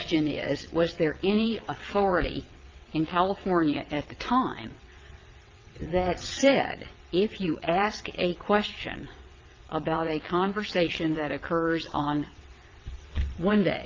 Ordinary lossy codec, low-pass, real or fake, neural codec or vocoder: Opus, 32 kbps; 7.2 kHz; fake; vocoder, 22.05 kHz, 80 mel bands, WaveNeXt